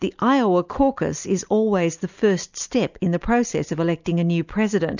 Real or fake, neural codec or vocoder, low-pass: real; none; 7.2 kHz